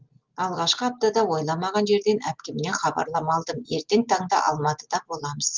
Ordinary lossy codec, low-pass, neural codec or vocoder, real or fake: Opus, 32 kbps; 7.2 kHz; none; real